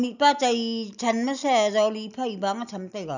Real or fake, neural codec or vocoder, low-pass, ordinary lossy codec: real; none; 7.2 kHz; none